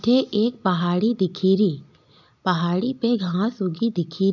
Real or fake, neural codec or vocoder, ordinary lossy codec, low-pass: real; none; none; 7.2 kHz